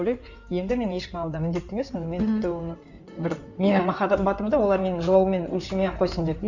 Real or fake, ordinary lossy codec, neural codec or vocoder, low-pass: fake; none; codec, 16 kHz in and 24 kHz out, 2.2 kbps, FireRedTTS-2 codec; 7.2 kHz